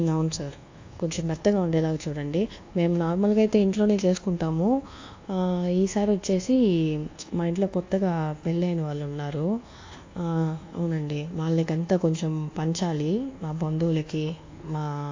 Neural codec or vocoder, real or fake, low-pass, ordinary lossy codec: codec, 24 kHz, 1.2 kbps, DualCodec; fake; 7.2 kHz; AAC, 48 kbps